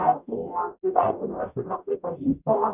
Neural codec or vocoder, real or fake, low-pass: codec, 44.1 kHz, 0.9 kbps, DAC; fake; 3.6 kHz